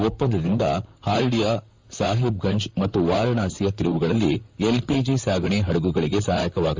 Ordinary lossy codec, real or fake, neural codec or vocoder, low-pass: Opus, 32 kbps; fake; vocoder, 44.1 kHz, 128 mel bands, Pupu-Vocoder; 7.2 kHz